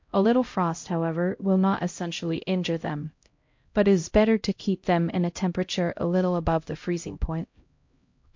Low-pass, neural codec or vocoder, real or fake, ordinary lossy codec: 7.2 kHz; codec, 16 kHz, 0.5 kbps, X-Codec, HuBERT features, trained on LibriSpeech; fake; MP3, 48 kbps